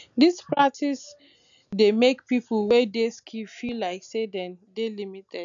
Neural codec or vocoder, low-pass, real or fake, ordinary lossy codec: none; 7.2 kHz; real; none